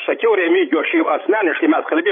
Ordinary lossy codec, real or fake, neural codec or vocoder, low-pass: MP3, 24 kbps; fake; codec, 16 kHz, 16 kbps, FreqCodec, larger model; 5.4 kHz